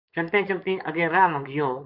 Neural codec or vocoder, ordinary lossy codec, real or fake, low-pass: codec, 16 kHz, 4.8 kbps, FACodec; MP3, 48 kbps; fake; 5.4 kHz